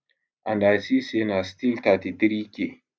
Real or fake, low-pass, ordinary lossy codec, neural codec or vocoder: real; none; none; none